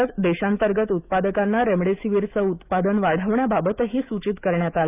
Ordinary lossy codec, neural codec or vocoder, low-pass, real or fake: none; autoencoder, 48 kHz, 128 numbers a frame, DAC-VAE, trained on Japanese speech; 3.6 kHz; fake